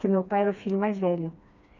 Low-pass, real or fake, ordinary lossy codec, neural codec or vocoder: 7.2 kHz; fake; none; codec, 16 kHz, 2 kbps, FreqCodec, smaller model